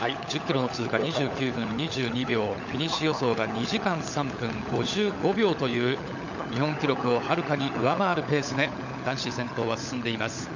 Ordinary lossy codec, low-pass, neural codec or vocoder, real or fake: none; 7.2 kHz; codec, 16 kHz, 16 kbps, FunCodec, trained on LibriTTS, 50 frames a second; fake